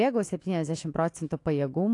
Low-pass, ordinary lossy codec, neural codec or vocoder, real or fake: 10.8 kHz; AAC, 48 kbps; autoencoder, 48 kHz, 128 numbers a frame, DAC-VAE, trained on Japanese speech; fake